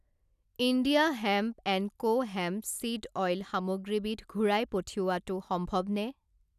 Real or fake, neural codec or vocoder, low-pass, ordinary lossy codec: real; none; 14.4 kHz; none